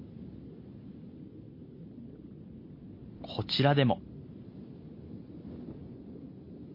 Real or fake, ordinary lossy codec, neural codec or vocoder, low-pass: real; MP3, 32 kbps; none; 5.4 kHz